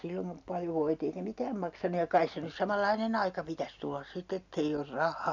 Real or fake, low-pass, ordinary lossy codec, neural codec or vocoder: real; 7.2 kHz; none; none